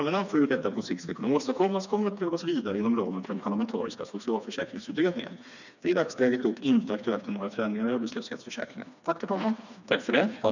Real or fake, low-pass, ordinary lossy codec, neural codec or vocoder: fake; 7.2 kHz; none; codec, 16 kHz, 2 kbps, FreqCodec, smaller model